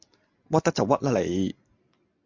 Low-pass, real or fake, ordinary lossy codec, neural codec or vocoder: 7.2 kHz; real; MP3, 48 kbps; none